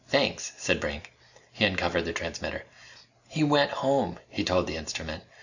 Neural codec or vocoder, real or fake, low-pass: none; real; 7.2 kHz